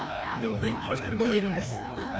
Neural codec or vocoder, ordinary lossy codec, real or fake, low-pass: codec, 16 kHz, 1 kbps, FreqCodec, larger model; none; fake; none